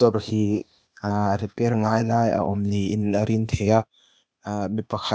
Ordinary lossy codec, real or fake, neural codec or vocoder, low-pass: none; fake; codec, 16 kHz, 0.8 kbps, ZipCodec; none